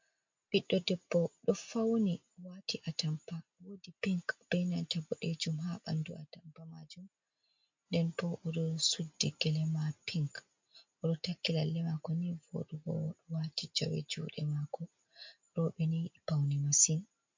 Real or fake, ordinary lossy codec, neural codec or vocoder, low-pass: real; MP3, 48 kbps; none; 7.2 kHz